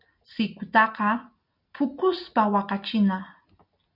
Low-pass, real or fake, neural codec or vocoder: 5.4 kHz; real; none